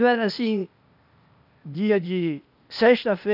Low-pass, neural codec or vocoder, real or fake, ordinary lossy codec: 5.4 kHz; codec, 16 kHz, 0.8 kbps, ZipCodec; fake; none